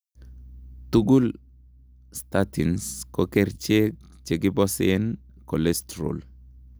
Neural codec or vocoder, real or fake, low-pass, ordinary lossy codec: none; real; none; none